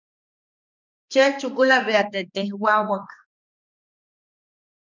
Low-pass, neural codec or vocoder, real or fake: 7.2 kHz; codec, 16 kHz, 2 kbps, X-Codec, HuBERT features, trained on balanced general audio; fake